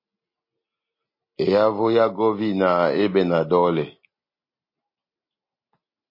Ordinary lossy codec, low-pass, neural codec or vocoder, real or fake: MP3, 24 kbps; 5.4 kHz; none; real